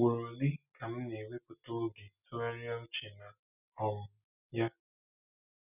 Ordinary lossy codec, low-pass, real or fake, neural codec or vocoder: none; 3.6 kHz; real; none